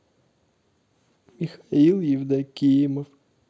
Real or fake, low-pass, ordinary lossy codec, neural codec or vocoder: real; none; none; none